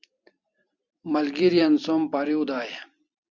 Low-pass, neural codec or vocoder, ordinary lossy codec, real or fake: 7.2 kHz; vocoder, 44.1 kHz, 128 mel bands every 256 samples, BigVGAN v2; Opus, 64 kbps; fake